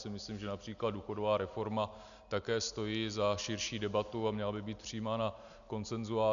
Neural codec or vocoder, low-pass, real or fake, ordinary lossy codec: none; 7.2 kHz; real; MP3, 96 kbps